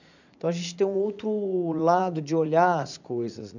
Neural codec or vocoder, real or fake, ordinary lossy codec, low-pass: vocoder, 44.1 kHz, 80 mel bands, Vocos; fake; none; 7.2 kHz